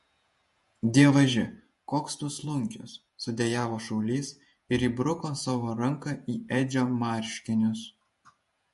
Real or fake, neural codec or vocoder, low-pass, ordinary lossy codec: fake; vocoder, 48 kHz, 128 mel bands, Vocos; 14.4 kHz; MP3, 48 kbps